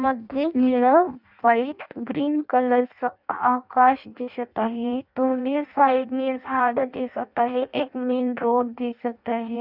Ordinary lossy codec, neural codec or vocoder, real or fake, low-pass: none; codec, 16 kHz in and 24 kHz out, 0.6 kbps, FireRedTTS-2 codec; fake; 5.4 kHz